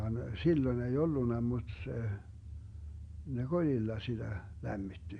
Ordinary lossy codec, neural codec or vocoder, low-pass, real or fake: MP3, 64 kbps; none; 9.9 kHz; real